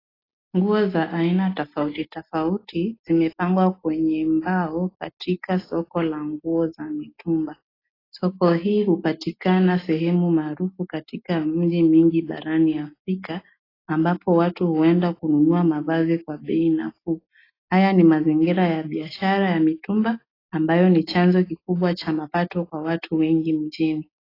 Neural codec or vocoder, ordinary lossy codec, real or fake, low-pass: none; AAC, 24 kbps; real; 5.4 kHz